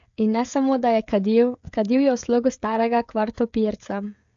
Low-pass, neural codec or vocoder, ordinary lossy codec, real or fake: 7.2 kHz; codec, 16 kHz, 16 kbps, FreqCodec, smaller model; AAC, 64 kbps; fake